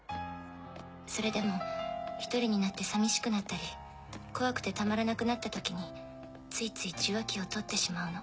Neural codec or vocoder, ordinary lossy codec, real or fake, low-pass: none; none; real; none